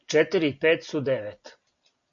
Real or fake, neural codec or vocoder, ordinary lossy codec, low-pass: real; none; MP3, 96 kbps; 7.2 kHz